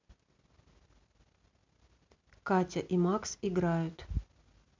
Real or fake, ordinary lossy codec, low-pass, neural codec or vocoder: real; MP3, 64 kbps; 7.2 kHz; none